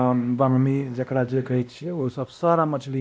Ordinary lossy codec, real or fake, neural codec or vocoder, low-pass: none; fake; codec, 16 kHz, 1 kbps, X-Codec, WavLM features, trained on Multilingual LibriSpeech; none